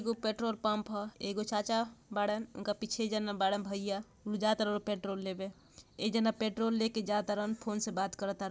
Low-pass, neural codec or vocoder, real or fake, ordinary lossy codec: none; none; real; none